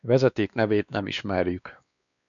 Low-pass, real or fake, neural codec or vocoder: 7.2 kHz; fake; codec, 16 kHz, 2 kbps, X-Codec, WavLM features, trained on Multilingual LibriSpeech